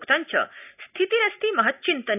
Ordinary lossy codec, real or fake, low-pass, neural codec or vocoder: none; real; 3.6 kHz; none